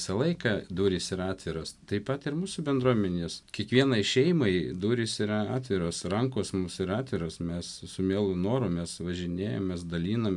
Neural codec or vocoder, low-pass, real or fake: none; 10.8 kHz; real